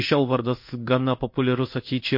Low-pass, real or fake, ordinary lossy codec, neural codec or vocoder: 5.4 kHz; fake; MP3, 32 kbps; codec, 16 kHz in and 24 kHz out, 1 kbps, XY-Tokenizer